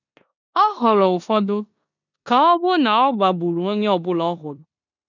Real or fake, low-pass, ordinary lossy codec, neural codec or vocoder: fake; 7.2 kHz; none; codec, 16 kHz in and 24 kHz out, 0.9 kbps, LongCat-Audio-Codec, four codebook decoder